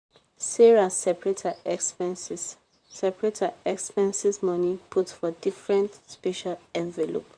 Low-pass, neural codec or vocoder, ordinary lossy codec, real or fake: 9.9 kHz; vocoder, 44.1 kHz, 128 mel bands, Pupu-Vocoder; none; fake